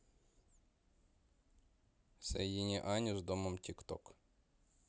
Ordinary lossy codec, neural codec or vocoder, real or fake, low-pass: none; none; real; none